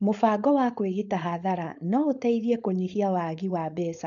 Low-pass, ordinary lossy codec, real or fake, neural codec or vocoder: 7.2 kHz; none; fake; codec, 16 kHz, 4.8 kbps, FACodec